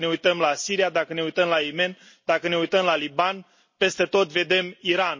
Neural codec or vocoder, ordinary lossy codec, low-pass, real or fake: none; MP3, 32 kbps; 7.2 kHz; real